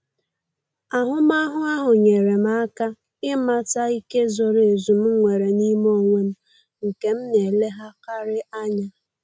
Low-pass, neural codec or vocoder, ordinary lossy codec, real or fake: none; none; none; real